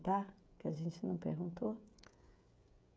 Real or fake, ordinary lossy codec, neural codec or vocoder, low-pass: fake; none; codec, 16 kHz, 16 kbps, FreqCodec, smaller model; none